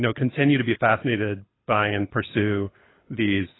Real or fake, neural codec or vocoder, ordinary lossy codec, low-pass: fake; codec, 24 kHz, 6 kbps, HILCodec; AAC, 16 kbps; 7.2 kHz